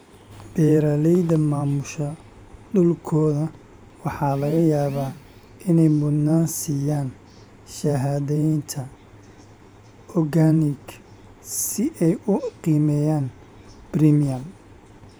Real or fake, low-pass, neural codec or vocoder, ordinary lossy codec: fake; none; vocoder, 44.1 kHz, 128 mel bands every 512 samples, BigVGAN v2; none